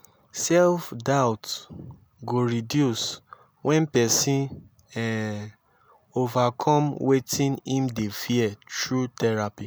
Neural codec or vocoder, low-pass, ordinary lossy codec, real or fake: none; none; none; real